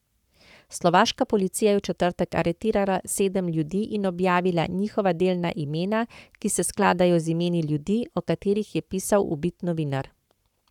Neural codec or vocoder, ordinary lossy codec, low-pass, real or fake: codec, 44.1 kHz, 7.8 kbps, Pupu-Codec; none; 19.8 kHz; fake